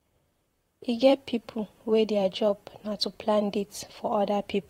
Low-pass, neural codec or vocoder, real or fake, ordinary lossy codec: 19.8 kHz; vocoder, 44.1 kHz, 128 mel bands, Pupu-Vocoder; fake; AAC, 48 kbps